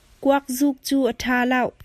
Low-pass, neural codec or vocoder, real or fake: 14.4 kHz; none; real